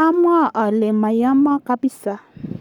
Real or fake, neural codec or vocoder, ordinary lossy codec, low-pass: fake; codec, 44.1 kHz, 7.8 kbps, Pupu-Codec; none; 19.8 kHz